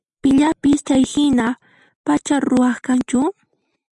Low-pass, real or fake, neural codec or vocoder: 10.8 kHz; real; none